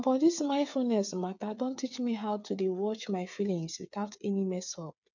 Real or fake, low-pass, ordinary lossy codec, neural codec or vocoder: fake; 7.2 kHz; none; codec, 16 kHz, 8 kbps, FreqCodec, smaller model